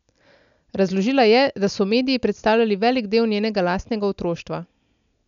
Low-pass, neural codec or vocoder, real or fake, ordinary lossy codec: 7.2 kHz; none; real; none